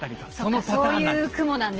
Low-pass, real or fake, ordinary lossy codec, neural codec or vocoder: 7.2 kHz; real; Opus, 16 kbps; none